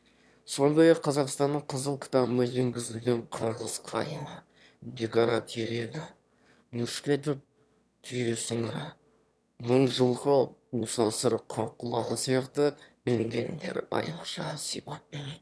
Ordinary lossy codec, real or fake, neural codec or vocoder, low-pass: none; fake; autoencoder, 22.05 kHz, a latent of 192 numbers a frame, VITS, trained on one speaker; none